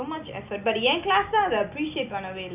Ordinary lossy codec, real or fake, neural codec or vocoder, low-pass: none; real; none; 3.6 kHz